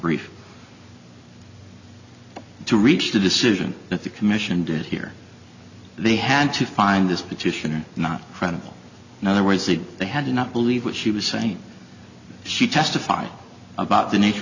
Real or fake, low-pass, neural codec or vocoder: real; 7.2 kHz; none